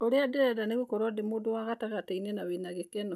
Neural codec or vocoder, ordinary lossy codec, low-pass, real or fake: none; none; 14.4 kHz; real